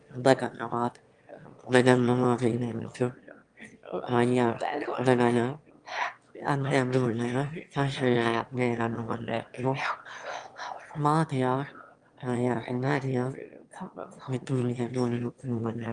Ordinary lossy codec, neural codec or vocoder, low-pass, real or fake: Opus, 32 kbps; autoencoder, 22.05 kHz, a latent of 192 numbers a frame, VITS, trained on one speaker; 9.9 kHz; fake